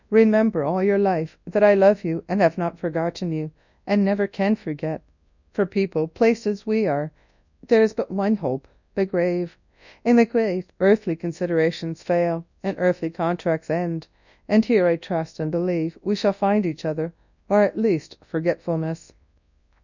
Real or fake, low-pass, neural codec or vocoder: fake; 7.2 kHz; codec, 24 kHz, 0.9 kbps, WavTokenizer, large speech release